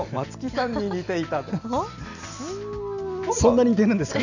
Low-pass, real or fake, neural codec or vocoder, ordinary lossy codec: 7.2 kHz; real; none; none